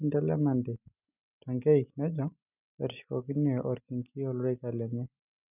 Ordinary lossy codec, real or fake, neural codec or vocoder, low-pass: none; real; none; 3.6 kHz